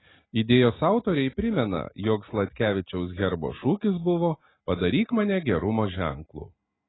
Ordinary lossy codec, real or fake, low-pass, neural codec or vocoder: AAC, 16 kbps; real; 7.2 kHz; none